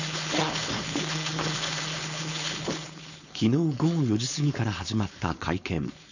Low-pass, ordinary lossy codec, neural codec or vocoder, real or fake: 7.2 kHz; none; codec, 16 kHz, 4.8 kbps, FACodec; fake